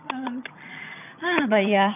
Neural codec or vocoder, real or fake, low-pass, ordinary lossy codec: vocoder, 22.05 kHz, 80 mel bands, HiFi-GAN; fake; 3.6 kHz; none